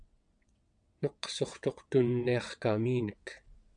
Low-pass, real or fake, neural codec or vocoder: 9.9 kHz; fake; vocoder, 22.05 kHz, 80 mel bands, WaveNeXt